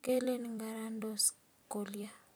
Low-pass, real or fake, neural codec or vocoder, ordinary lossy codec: none; fake; vocoder, 44.1 kHz, 128 mel bands every 256 samples, BigVGAN v2; none